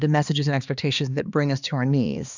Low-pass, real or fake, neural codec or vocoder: 7.2 kHz; fake; codec, 16 kHz, 2 kbps, X-Codec, HuBERT features, trained on balanced general audio